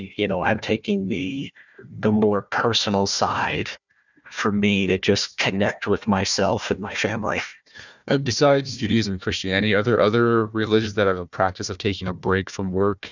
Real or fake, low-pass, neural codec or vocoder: fake; 7.2 kHz; codec, 16 kHz, 1 kbps, FunCodec, trained on Chinese and English, 50 frames a second